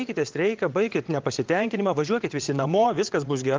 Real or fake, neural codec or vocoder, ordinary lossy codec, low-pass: fake; vocoder, 22.05 kHz, 80 mel bands, WaveNeXt; Opus, 32 kbps; 7.2 kHz